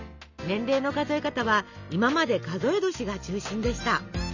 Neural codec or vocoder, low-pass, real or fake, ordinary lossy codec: none; 7.2 kHz; real; none